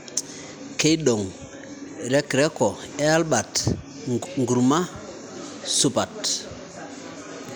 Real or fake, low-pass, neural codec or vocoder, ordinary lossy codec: real; none; none; none